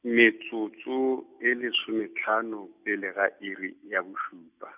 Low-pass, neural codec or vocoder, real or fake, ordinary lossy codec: 3.6 kHz; none; real; none